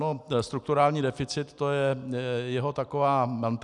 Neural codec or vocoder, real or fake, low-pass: none; real; 10.8 kHz